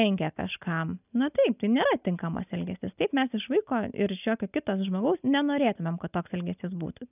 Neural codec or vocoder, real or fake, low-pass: none; real; 3.6 kHz